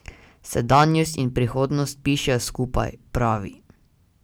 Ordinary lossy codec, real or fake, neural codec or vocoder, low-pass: none; real; none; none